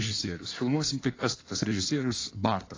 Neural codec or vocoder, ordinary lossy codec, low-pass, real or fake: codec, 16 kHz in and 24 kHz out, 1.1 kbps, FireRedTTS-2 codec; AAC, 32 kbps; 7.2 kHz; fake